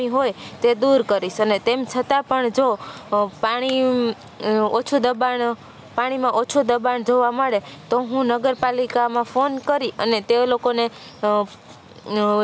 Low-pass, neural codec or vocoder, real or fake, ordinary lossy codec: none; none; real; none